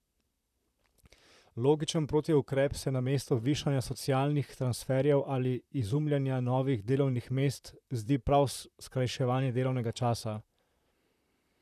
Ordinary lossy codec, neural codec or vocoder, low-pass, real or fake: none; vocoder, 44.1 kHz, 128 mel bands, Pupu-Vocoder; 14.4 kHz; fake